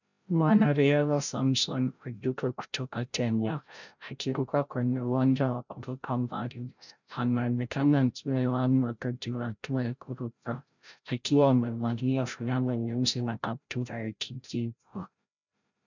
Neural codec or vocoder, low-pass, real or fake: codec, 16 kHz, 0.5 kbps, FreqCodec, larger model; 7.2 kHz; fake